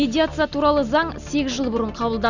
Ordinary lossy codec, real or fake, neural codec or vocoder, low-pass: none; real; none; 7.2 kHz